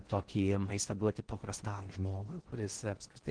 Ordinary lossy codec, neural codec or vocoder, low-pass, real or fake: Opus, 16 kbps; codec, 16 kHz in and 24 kHz out, 0.6 kbps, FocalCodec, streaming, 4096 codes; 9.9 kHz; fake